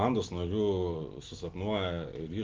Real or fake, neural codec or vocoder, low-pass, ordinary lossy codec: real; none; 7.2 kHz; Opus, 16 kbps